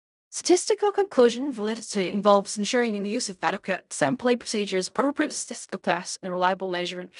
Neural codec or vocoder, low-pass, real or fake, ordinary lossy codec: codec, 16 kHz in and 24 kHz out, 0.4 kbps, LongCat-Audio-Codec, fine tuned four codebook decoder; 10.8 kHz; fake; none